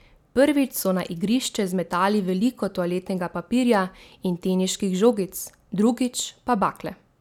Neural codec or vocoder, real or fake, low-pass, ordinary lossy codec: none; real; 19.8 kHz; none